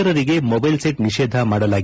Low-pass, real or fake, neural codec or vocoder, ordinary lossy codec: none; real; none; none